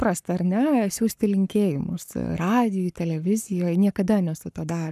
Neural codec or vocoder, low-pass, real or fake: codec, 44.1 kHz, 7.8 kbps, Pupu-Codec; 14.4 kHz; fake